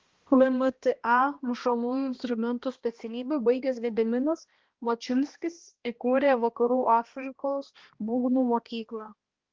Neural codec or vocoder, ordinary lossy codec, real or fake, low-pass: codec, 16 kHz, 1 kbps, X-Codec, HuBERT features, trained on balanced general audio; Opus, 16 kbps; fake; 7.2 kHz